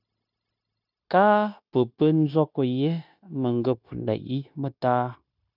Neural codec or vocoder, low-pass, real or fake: codec, 16 kHz, 0.9 kbps, LongCat-Audio-Codec; 5.4 kHz; fake